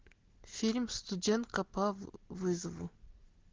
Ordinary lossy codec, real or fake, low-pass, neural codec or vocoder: Opus, 16 kbps; real; 7.2 kHz; none